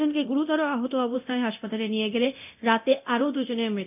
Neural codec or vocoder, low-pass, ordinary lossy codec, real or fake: codec, 24 kHz, 0.9 kbps, DualCodec; 3.6 kHz; none; fake